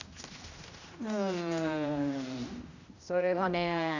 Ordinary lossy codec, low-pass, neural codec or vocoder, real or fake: none; 7.2 kHz; codec, 16 kHz, 1 kbps, X-Codec, HuBERT features, trained on general audio; fake